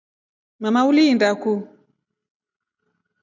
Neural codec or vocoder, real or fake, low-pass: none; real; 7.2 kHz